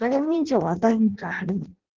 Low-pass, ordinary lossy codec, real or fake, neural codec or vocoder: 7.2 kHz; Opus, 16 kbps; fake; codec, 16 kHz in and 24 kHz out, 0.6 kbps, FireRedTTS-2 codec